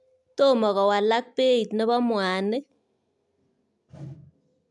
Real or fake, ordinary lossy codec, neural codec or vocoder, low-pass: real; none; none; 10.8 kHz